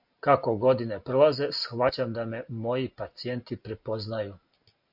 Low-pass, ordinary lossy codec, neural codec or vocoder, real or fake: 5.4 kHz; AAC, 48 kbps; none; real